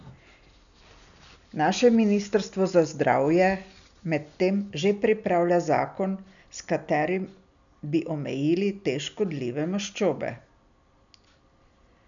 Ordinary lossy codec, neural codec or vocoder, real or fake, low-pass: none; none; real; 7.2 kHz